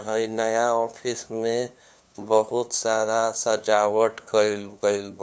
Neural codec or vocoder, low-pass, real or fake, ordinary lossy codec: codec, 16 kHz, 2 kbps, FunCodec, trained on LibriTTS, 25 frames a second; none; fake; none